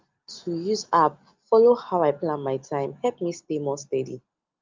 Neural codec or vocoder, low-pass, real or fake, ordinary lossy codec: none; 7.2 kHz; real; Opus, 32 kbps